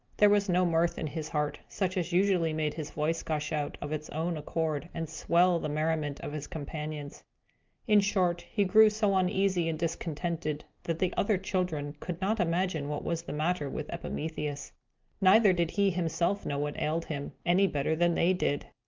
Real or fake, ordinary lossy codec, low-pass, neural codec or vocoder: real; Opus, 24 kbps; 7.2 kHz; none